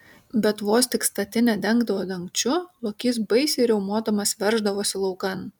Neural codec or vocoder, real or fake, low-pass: none; real; 19.8 kHz